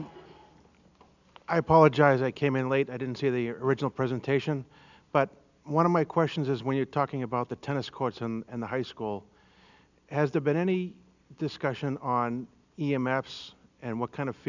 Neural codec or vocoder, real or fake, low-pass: none; real; 7.2 kHz